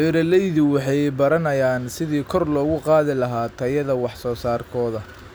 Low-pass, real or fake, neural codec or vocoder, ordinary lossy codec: none; real; none; none